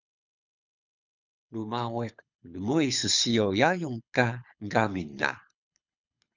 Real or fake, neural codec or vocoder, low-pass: fake; codec, 24 kHz, 6 kbps, HILCodec; 7.2 kHz